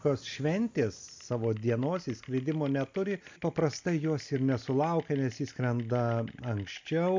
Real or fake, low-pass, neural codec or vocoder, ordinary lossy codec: real; 7.2 kHz; none; MP3, 64 kbps